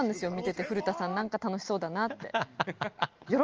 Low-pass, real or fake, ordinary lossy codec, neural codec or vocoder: 7.2 kHz; real; Opus, 24 kbps; none